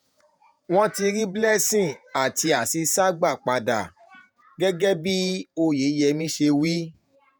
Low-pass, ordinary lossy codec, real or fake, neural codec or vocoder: none; none; fake; vocoder, 48 kHz, 128 mel bands, Vocos